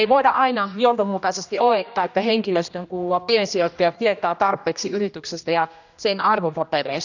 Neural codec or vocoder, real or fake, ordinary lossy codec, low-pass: codec, 16 kHz, 1 kbps, X-Codec, HuBERT features, trained on general audio; fake; none; 7.2 kHz